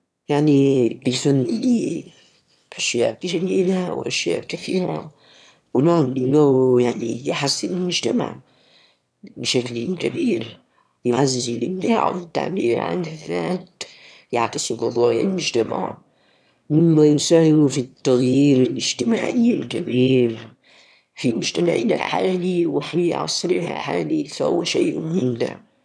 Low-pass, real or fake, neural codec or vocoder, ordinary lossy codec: none; fake; autoencoder, 22.05 kHz, a latent of 192 numbers a frame, VITS, trained on one speaker; none